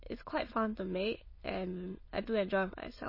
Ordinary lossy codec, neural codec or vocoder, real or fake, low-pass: MP3, 24 kbps; autoencoder, 22.05 kHz, a latent of 192 numbers a frame, VITS, trained on many speakers; fake; 5.4 kHz